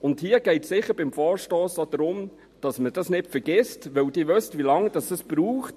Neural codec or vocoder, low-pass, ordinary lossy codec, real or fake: none; 14.4 kHz; MP3, 64 kbps; real